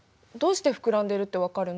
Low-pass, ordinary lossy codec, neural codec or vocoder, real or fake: none; none; none; real